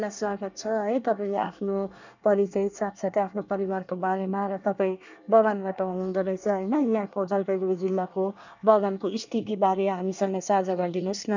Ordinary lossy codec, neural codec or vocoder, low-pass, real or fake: none; codec, 24 kHz, 1 kbps, SNAC; 7.2 kHz; fake